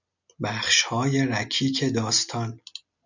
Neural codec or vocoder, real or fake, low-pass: none; real; 7.2 kHz